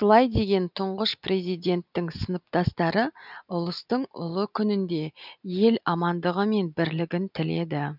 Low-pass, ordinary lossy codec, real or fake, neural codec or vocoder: 5.4 kHz; none; real; none